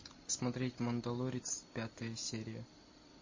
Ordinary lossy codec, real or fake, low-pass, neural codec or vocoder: MP3, 32 kbps; real; 7.2 kHz; none